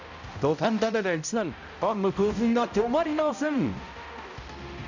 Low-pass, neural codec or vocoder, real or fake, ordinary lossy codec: 7.2 kHz; codec, 16 kHz, 0.5 kbps, X-Codec, HuBERT features, trained on balanced general audio; fake; none